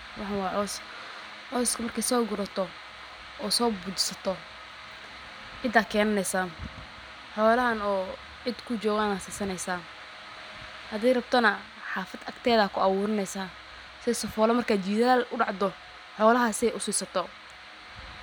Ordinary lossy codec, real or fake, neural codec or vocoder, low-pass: none; real; none; none